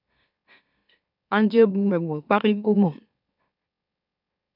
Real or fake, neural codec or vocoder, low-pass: fake; autoencoder, 44.1 kHz, a latent of 192 numbers a frame, MeloTTS; 5.4 kHz